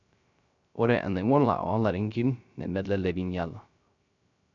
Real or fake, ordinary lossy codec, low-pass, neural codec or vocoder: fake; none; 7.2 kHz; codec, 16 kHz, 0.3 kbps, FocalCodec